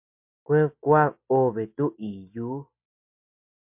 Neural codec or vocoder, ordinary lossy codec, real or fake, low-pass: none; MP3, 32 kbps; real; 3.6 kHz